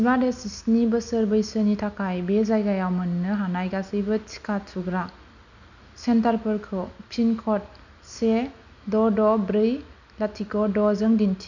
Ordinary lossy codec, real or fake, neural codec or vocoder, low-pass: none; real; none; 7.2 kHz